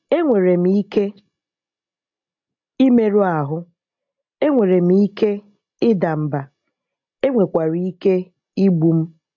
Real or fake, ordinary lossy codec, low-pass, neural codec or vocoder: real; AAC, 48 kbps; 7.2 kHz; none